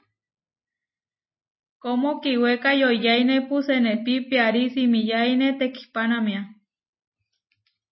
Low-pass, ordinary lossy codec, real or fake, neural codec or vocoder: 7.2 kHz; MP3, 24 kbps; real; none